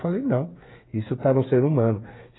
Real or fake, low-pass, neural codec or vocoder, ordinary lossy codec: fake; 7.2 kHz; codec, 16 kHz, 8 kbps, FreqCodec, smaller model; AAC, 16 kbps